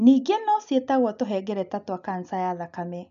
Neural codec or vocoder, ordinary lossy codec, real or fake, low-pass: none; none; real; 7.2 kHz